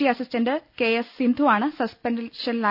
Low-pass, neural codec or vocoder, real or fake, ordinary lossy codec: 5.4 kHz; none; real; none